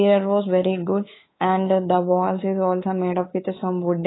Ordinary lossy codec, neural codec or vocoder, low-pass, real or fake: AAC, 16 kbps; codec, 24 kHz, 3.1 kbps, DualCodec; 7.2 kHz; fake